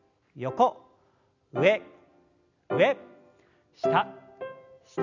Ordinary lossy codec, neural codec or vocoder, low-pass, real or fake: none; none; 7.2 kHz; real